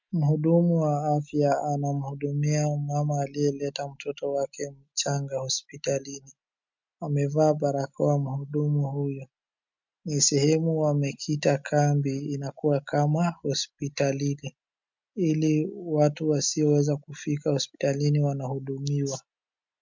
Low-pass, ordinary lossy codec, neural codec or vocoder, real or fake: 7.2 kHz; MP3, 64 kbps; none; real